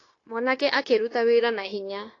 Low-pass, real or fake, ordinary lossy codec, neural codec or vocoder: 7.2 kHz; fake; AAC, 32 kbps; codec, 16 kHz, 0.9 kbps, LongCat-Audio-Codec